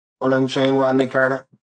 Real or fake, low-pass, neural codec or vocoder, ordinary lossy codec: fake; 9.9 kHz; codec, 24 kHz, 0.9 kbps, WavTokenizer, medium music audio release; MP3, 48 kbps